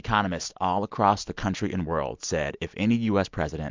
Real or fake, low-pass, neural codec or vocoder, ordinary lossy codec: real; 7.2 kHz; none; MP3, 64 kbps